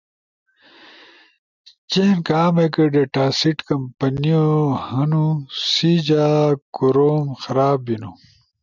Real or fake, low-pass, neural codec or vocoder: real; 7.2 kHz; none